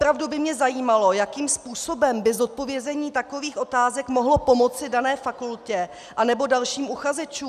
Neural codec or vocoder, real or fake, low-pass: none; real; 14.4 kHz